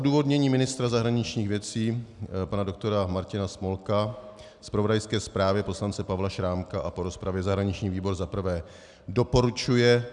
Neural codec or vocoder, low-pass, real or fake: none; 10.8 kHz; real